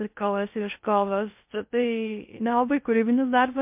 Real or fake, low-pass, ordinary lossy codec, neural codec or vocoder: fake; 3.6 kHz; MP3, 32 kbps; codec, 16 kHz in and 24 kHz out, 0.6 kbps, FocalCodec, streaming, 2048 codes